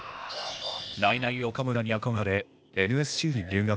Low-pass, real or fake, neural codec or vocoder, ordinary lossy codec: none; fake; codec, 16 kHz, 0.8 kbps, ZipCodec; none